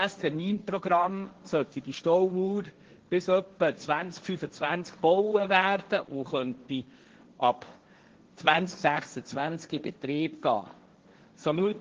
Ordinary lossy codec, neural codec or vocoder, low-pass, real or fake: Opus, 16 kbps; codec, 16 kHz, 1.1 kbps, Voila-Tokenizer; 7.2 kHz; fake